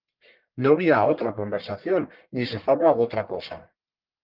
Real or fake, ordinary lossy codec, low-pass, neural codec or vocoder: fake; Opus, 32 kbps; 5.4 kHz; codec, 44.1 kHz, 1.7 kbps, Pupu-Codec